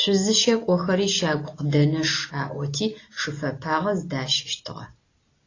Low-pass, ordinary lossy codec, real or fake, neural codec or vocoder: 7.2 kHz; AAC, 32 kbps; real; none